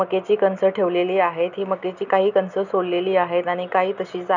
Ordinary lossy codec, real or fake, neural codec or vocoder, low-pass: none; real; none; 7.2 kHz